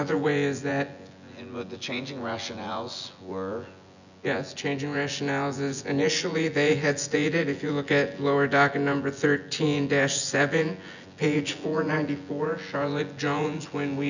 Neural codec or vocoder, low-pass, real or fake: vocoder, 24 kHz, 100 mel bands, Vocos; 7.2 kHz; fake